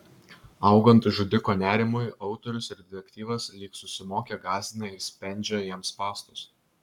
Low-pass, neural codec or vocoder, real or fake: 19.8 kHz; codec, 44.1 kHz, 7.8 kbps, Pupu-Codec; fake